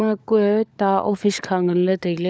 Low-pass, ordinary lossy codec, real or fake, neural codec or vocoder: none; none; fake; codec, 16 kHz, 16 kbps, FunCodec, trained on LibriTTS, 50 frames a second